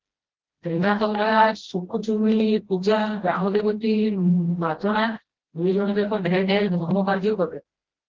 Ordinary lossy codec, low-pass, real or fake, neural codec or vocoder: Opus, 16 kbps; 7.2 kHz; fake; codec, 16 kHz, 1 kbps, FreqCodec, smaller model